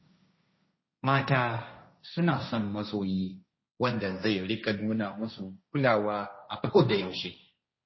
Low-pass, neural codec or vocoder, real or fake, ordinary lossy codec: 7.2 kHz; codec, 16 kHz, 1.1 kbps, Voila-Tokenizer; fake; MP3, 24 kbps